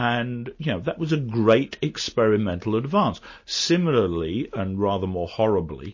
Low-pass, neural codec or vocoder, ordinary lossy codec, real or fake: 7.2 kHz; none; MP3, 32 kbps; real